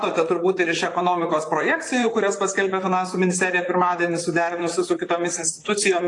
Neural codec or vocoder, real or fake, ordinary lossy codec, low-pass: vocoder, 44.1 kHz, 128 mel bands, Pupu-Vocoder; fake; AAC, 48 kbps; 10.8 kHz